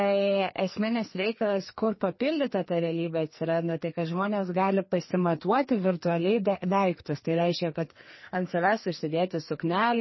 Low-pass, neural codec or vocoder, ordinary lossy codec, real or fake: 7.2 kHz; codec, 44.1 kHz, 2.6 kbps, SNAC; MP3, 24 kbps; fake